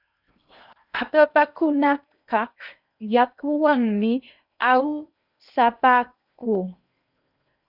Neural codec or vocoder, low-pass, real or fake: codec, 16 kHz in and 24 kHz out, 0.8 kbps, FocalCodec, streaming, 65536 codes; 5.4 kHz; fake